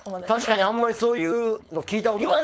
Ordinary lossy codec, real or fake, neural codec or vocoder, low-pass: none; fake; codec, 16 kHz, 4.8 kbps, FACodec; none